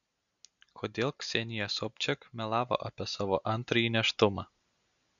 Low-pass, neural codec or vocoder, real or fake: 7.2 kHz; none; real